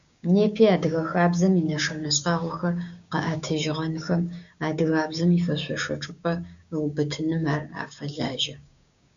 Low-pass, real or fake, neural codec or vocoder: 7.2 kHz; fake; codec, 16 kHz, 6 kbps, DAC